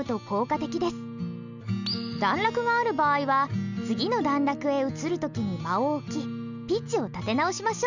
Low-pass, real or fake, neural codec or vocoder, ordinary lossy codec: 7.2 kHz; real; none; none